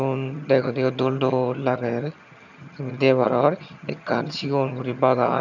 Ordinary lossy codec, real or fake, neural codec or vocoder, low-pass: none; fake; vocoder, 22.05 kHz, 80 mel bands, HiFi-GAN; 7.2 kHz